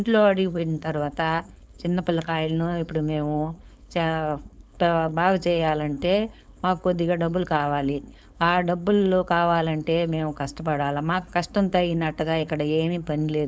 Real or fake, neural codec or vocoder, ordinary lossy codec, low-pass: fake; codec, 16 kHz, 4.8 kbps, FACodec; none; none